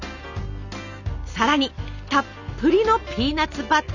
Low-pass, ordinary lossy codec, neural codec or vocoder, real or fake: 7.2 kHz; none; none; real